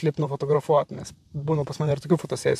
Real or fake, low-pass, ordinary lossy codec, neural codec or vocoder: fake; 14.4 kHz; MP3, 96 kbps; vocoder, 44.1 kHz, 128 mel bands, Pupu-Vocoder